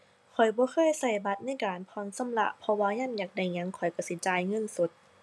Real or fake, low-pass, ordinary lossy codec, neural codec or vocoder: real; none; none; none